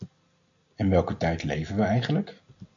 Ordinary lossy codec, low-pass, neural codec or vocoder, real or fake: MP3, 64 kbps; 7.2 kHz; none; real